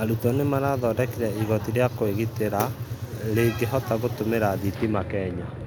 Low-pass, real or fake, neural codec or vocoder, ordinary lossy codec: none; real; none; none